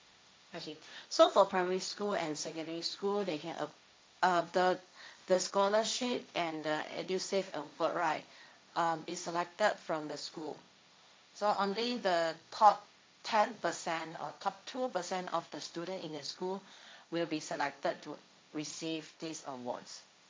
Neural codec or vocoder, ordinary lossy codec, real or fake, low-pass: codec, 16 kHz, 1.1 kbps, Voila-Tokenizer; none; fake; none